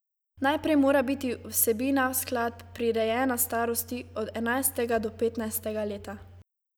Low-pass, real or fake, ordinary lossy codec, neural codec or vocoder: none; real; none; none